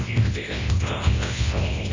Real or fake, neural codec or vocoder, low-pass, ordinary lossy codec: fake; codec, 24 kHz, 0.9 kbps, WavTokenizer, large speech release; 7.2 kHz; AAC, 32 kbps